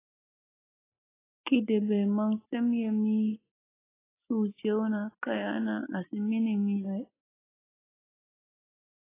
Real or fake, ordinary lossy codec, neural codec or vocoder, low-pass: real; AAC, 16 kbps; none; 3.6 kHz